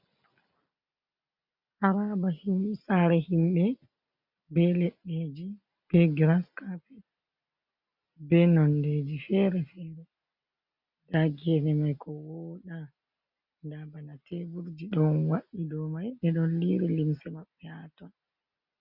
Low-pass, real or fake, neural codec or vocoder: 5.4 kHz; real; none